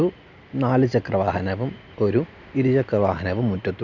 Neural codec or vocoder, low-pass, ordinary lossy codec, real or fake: none; 7.2 kHz; none; real